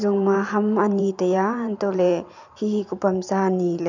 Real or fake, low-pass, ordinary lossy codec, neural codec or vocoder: fake; 7.2 kHz; none; vocoder, 44.1 kHz, 128 mel bands every 512 samples, BigVGAN v2